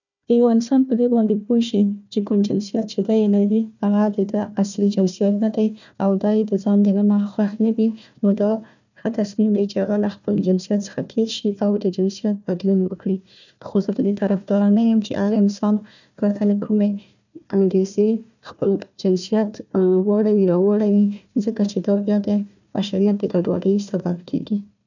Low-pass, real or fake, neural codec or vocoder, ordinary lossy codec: 7.2 kHz; fake; codec, 16 kHz, 1 kbps, FunCodec, trained on Chinese and English, 50 frames a second; none